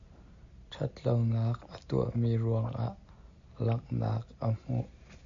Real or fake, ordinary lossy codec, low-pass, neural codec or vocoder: real; AAC, 64 kbps; 7.2 kHz; none